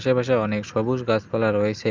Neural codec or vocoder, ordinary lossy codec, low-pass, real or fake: none; Opus, 24 kbps; 7.2 kHz; real